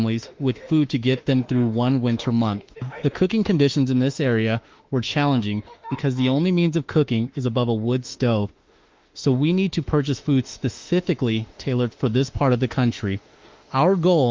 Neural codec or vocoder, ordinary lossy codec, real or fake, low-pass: autoencoder, 48 kHz, 32 numbers a frame, DAC-VAE, trained on Japanese speech; Opus, 32 kbps; fake; 7.2 kHz